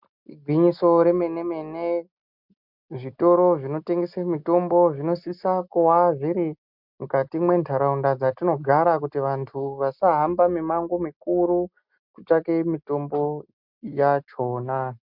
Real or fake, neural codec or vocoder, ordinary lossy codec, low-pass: real; none; MP3, 48 kbps; 5.4 kHz